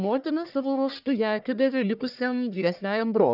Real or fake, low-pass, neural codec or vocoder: fake; 5.4 kHz; codec, 44.1 kHz, 1.7 kbps, Pupu-Codec